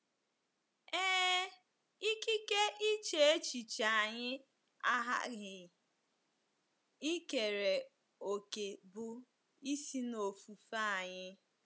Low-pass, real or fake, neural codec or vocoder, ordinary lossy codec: none; real; none; none